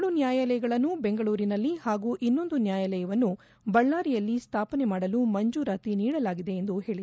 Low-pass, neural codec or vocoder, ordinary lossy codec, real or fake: none; none; none; real